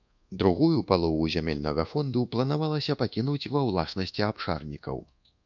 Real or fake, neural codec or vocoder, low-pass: fake; codec, 24 kHz, 1.2 kbps, DualCodec; 7.2 kHz